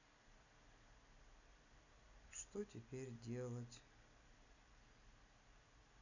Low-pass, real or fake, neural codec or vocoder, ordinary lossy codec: 7.2 kHz; real; none; none